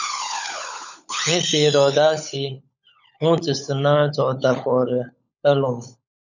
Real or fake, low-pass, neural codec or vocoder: fake; 7.2 kHz; codec, 16 kHz, 16 kbps, FunCodec, trained on LibriTTS, 50 frames a second